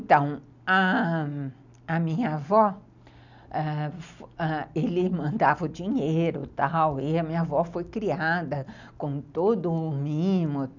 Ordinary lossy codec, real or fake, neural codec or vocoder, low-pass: none; real; none; 7.2 kHz